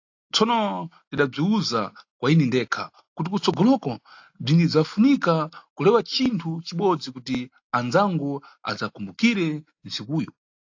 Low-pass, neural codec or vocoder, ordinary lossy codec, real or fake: 7.2 kHz; none; AAC, 48 kbps; real